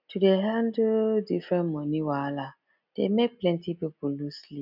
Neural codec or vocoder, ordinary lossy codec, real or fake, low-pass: none; none; real; 5.4 kHz